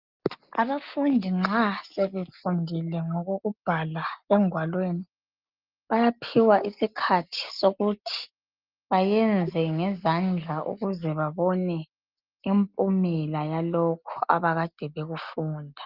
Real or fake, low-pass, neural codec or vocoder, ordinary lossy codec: real; 5.4 kHz; none; Opus, 32 kbps